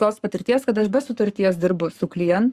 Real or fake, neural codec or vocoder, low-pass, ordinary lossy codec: fake; codec, 44.1 kHz, 7.8 kbps, Pupu-Codec; 14.4 kHz; Opus, 64 kbps